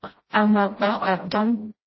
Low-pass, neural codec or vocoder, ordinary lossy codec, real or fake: 7.2 kHz; codec, 16 kHz, 0.5 kbps, FreqCodec, smaller model; MP3, 24 kbps; fake